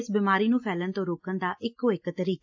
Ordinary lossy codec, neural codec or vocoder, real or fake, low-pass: AAC, 48 kbps; none; real; 7.2 kHz